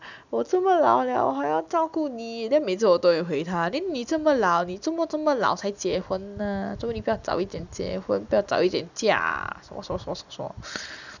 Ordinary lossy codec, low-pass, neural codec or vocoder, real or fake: none; 7.2 kHz; none; real